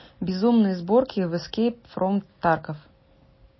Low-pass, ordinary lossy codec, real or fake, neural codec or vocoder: 7.2 kHz; MP3, 24 kbps; real; none